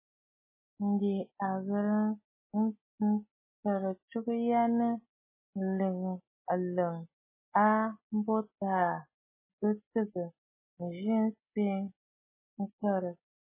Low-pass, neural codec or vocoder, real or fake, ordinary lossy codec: 3.6 kHz; none; real; MP3, 16 kbps